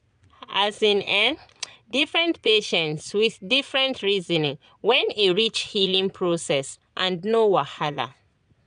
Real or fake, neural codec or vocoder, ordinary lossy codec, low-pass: fake; vocoder, 22.05 kHz, 80 mel bands, Vocos; none; 9.9 kHz